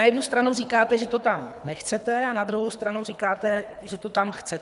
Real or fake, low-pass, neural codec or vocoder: fake; 10.8 kHz; codec, 24 kHz, 3 kbps, HILCodec